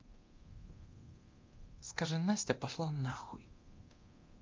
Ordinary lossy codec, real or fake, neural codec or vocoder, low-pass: Opus, 32 kbps; fake; codec, 24 kHz, 0.9 kbps, DualCodec; 7.2 kHz